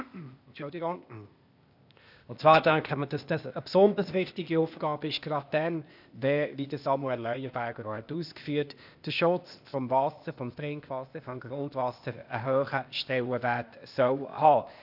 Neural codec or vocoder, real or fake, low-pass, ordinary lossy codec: codec, 16 kHz, 0.8 kbps, ZipCodec; fake; 5.4 kHz; none